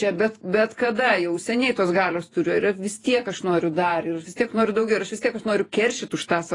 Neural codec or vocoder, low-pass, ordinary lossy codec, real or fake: vocoder, 48 kHz, 128 mel bands, Vocos; 10.8 kHz; AAC, 32 kbps; fake